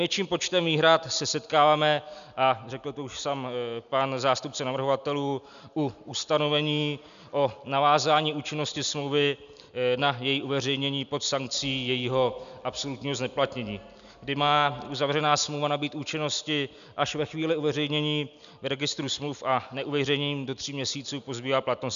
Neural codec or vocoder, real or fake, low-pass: none; real; 7.2 kHz